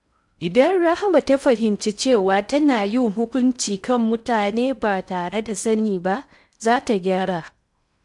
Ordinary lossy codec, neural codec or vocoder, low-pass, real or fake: MP3, 96 kbps; codec, 16 kHz in and 24 kHz out, 0.6 kbps, FocalCodec, streaming, 4096 codes; 10.8 kHz; fake